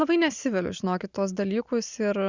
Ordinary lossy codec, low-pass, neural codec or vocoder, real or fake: Opus, 64 kbps; 7.2 kHz; none; real